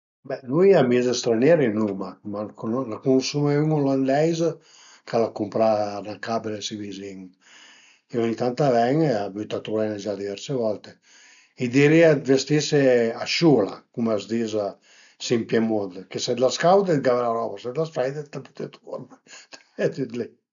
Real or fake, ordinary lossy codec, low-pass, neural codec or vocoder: real; none; 7.2 kHz; none